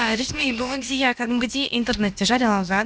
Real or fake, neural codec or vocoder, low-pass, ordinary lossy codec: fake; codec, 16 kHz, about 1 kbps, DyCAST, with the encoder's durations; none; none